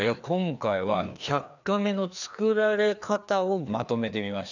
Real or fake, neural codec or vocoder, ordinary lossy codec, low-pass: fake; codec, 16 kHz, 2 kbps, FreqCodec, larger model; none; 7.2 kHz